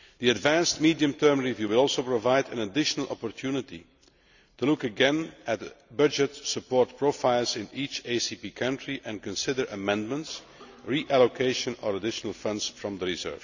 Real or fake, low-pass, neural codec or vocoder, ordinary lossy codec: real; 7.2 kHz; none; none